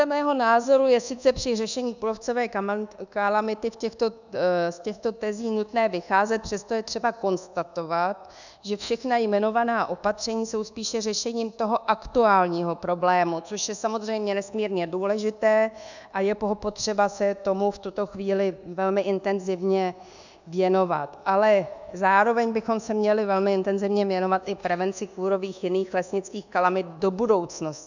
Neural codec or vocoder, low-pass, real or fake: codec, 24 kHz, 1.2 kbps, DualCodec; 7.2 kHz; fake